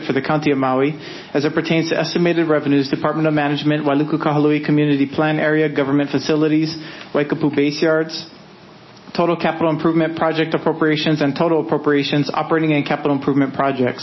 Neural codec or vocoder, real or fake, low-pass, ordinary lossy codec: none; real; 7.2 kHz; MP3, 24 kbps